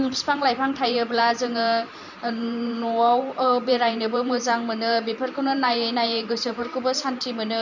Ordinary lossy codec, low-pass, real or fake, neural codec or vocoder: none; 7.2 kHz; fake; vocoder, 44.1 kHz, 128 mel bands every 512 samples, BigVGAN v2